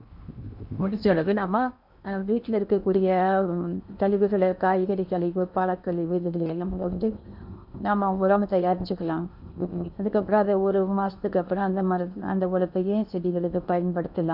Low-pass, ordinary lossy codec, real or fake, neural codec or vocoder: 5.4 kHz; none; fake; codec, 16 kHz in and 24 kHz out, 0.8 kbps, FocalCodec, streaming, 65536 codes